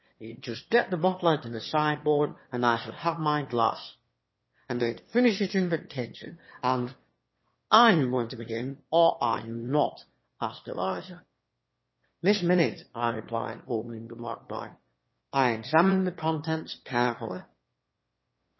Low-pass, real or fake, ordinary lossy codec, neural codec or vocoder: 7.2 kHz; fake; MP3, 24 kbps; autoencoder, 22.05 kHz, a latent of 192 numbers a frame, VITS, trained on one speaker